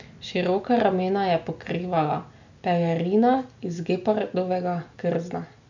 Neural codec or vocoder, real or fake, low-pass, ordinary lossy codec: none; real; 7.2 kHz; none